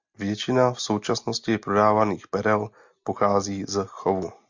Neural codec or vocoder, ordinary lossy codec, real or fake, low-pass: none; MP3, 64 kbps; real; 7.2 kHz